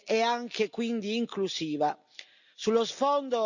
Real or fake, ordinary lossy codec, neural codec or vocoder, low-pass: real; none; none; 7.2 kHz